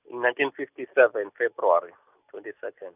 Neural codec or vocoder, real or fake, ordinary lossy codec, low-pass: none; real; none; 3.6 kHz